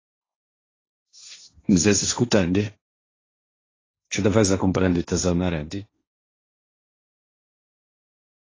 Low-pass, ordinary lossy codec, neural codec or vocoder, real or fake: 7.2 kHz; AAC, 32 kbps; codec, 16 kHz, 1.1 kbps, Voila-Tokenizer; fake